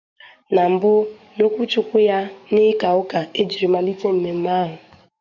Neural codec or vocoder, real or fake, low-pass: codec, 44.1 kHz, 7.8 kbps, DAC; fake; 7.2 kHz